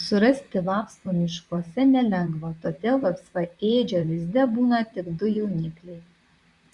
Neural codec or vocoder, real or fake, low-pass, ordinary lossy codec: vocoder, 44.1 kHz, 128 mel bands, Pupu-Vocoder; fake; 10.8 kHz; Opus, 64 kbps